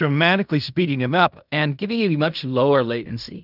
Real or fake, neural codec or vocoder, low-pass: fake; codec, 16 kHz in and 24 kHz out, 0.4 kbps, LongCat-Audio-Codec, fine tuned four codebook decoder; 5.4 kHz